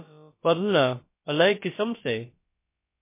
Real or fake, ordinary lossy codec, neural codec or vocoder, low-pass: fake; MP3, 24 kbps; codec, 16 kHz, about 1 kbps, DyCAST, with the encoder's durations; 3.6 kHz